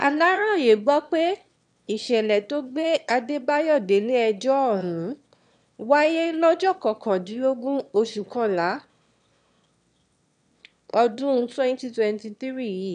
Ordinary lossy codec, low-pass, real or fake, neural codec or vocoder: none; 9.9 kHz; fake; autoencoder, 22.05 kHz, a latent of 192 numbers a frame, VITS, trained on one speaker